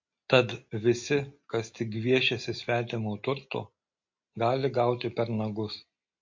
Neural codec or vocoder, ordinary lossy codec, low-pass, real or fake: none; MP3, 48 kbps; 7.2 kHz; real